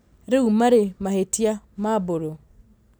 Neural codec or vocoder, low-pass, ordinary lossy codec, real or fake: none; none; none; real